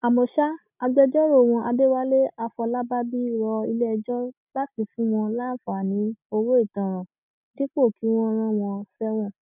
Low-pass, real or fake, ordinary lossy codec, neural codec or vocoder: 3.6 kHz; real; none; none